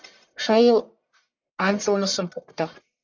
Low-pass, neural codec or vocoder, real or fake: 7.2 kHz; codec, 44.1 kHz, 1.7 kbps, Pupu-Codec; fake